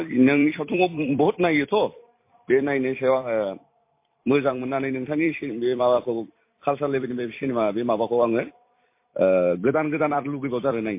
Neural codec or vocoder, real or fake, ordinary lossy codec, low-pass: vocoder, 44.1 kHz, 128 mel bands every 256 samples, BigVGAN v2; fake; MP3, 24 kbps; 3.6 kHz